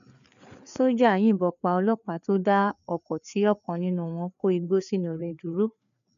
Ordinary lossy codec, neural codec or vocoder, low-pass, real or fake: none; codec, 16 kHz, 4 kbps, FreqCodec, larger model; 7.2 kHz; fake